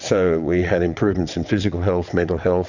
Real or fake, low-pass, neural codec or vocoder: fake; 7.2 kHz; vocoder, 22.05 kHz, 80 mel bands, WaveNeXt